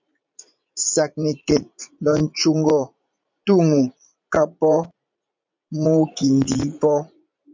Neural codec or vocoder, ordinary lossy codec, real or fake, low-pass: vocoder, 44.1 kHz, 80 mel bands, Vocos; MP3, 64 kbps; fake; 7.2 kHz